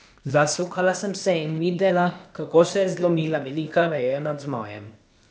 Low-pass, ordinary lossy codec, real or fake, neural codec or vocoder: none; none; fake; codec, 16 kHz, 0.8 kbps, ZipCodec